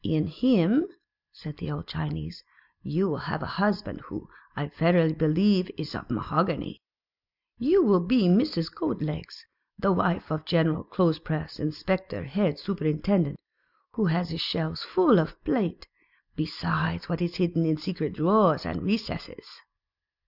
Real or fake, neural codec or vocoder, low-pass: real; none; 5.4 kHz